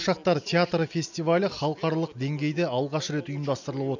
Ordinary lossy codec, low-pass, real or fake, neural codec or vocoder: none; 7.2 kHz; real; none